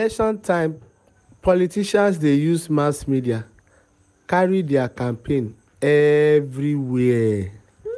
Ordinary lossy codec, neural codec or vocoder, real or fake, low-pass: none; none; real; none